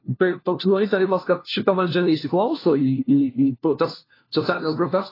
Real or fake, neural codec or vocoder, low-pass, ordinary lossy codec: fake; codec, 16 kHz, 1 kbps, FunCodec, trained on LibriTTS, 50 frames a second; 5.4 kHz; AAC, 24 kbps